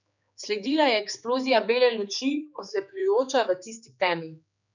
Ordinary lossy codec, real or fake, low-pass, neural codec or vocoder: none; fake; 7.2 kHz; codec, 16 kHz, 4 kbps, X-Codec, HuBERT features, trained on general audio